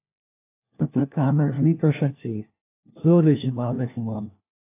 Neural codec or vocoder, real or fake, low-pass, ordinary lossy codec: codec, 16 kHz, 1 kbps, FunCodec, trained on LibriTTS, 50 frames a second; fake; 3.6 kHz; AAC, 24 kbps